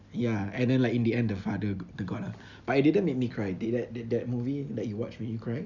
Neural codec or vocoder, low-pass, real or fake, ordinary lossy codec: none; 7.2 kHz; real; none